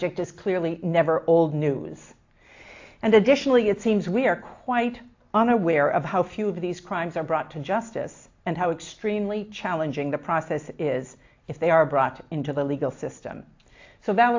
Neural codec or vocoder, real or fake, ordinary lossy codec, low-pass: none; real; AAC, 48 kbps; 7.2 kHz